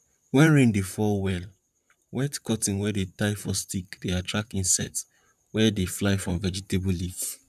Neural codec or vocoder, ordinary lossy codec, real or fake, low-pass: vocoder, 44.1 kHz, 128 mel bands, Pupu-Vocoder; none; fake; 14.4 kHz